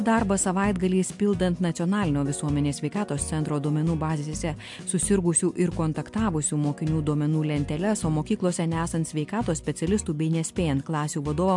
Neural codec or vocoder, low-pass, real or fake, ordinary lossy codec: none; 10.8 kHz; real; MP3, 64 kbps